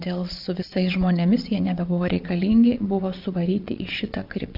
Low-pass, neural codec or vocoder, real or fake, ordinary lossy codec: 5.4 kHz; vocoder, 22.05 kHz, 80 mel bands, WaveNeXt; fake; AAC, 48 kbps